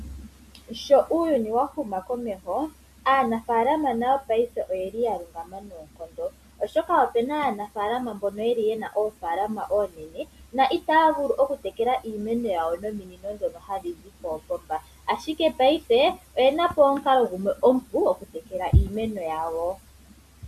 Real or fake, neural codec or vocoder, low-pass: real; none; 14.4 kHz